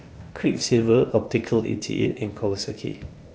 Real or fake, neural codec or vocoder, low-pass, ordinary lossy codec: fake; codec, 16 kHz, 0.8 kbps, ZipCodec; none; none